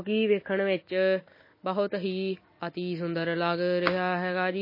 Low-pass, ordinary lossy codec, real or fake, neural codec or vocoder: 5.4 kHz; MP3, 24 kbps; real; none